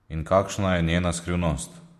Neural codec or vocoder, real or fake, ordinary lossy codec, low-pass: none; real; MP3, 64 kbps; 14.4 kHz